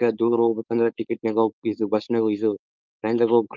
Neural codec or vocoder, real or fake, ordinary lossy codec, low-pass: codec, 16 kHz, 4.8 kbps, FACodec; fake; Opus, 32 kbps; 7.2 kHz